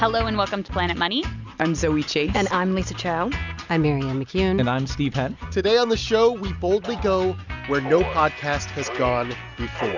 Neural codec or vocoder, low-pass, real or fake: none; 7.2 kHz; real